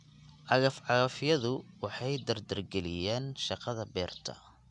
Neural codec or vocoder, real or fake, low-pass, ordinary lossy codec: none; real; 10.8 kHz; none